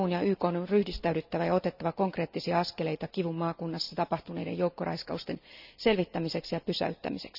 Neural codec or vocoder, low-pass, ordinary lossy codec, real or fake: none; 5.4 kHz; none; real